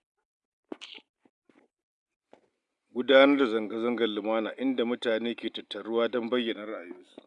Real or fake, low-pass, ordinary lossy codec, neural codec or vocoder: real; 10.8 kHz; none; none